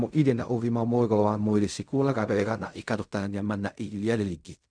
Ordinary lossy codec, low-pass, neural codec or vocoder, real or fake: none; 9.9 kHz; codec, 16 kHz in and 24 kHz out, 0.4 kbps, LongCat-Audio-Codec, fine tuned four codebook decoder; fake